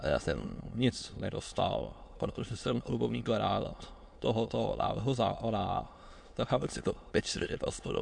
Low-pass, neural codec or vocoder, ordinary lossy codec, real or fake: 9.9 kHz; autoencoder, 22.05 kHz, a latent of 192 numbers a frame, VITS, trained on many speakers; MP3, 64 kbps; fake